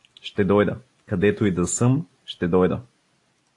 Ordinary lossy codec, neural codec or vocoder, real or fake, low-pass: AAC, 48 kbps; none; real; 10.8 kHz